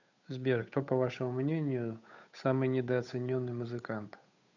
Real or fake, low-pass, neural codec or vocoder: fake; 7.2 kHz; codec, 16 kHz, 8 kbps, FunCodec, trained on Chinese and English, 25 frames a second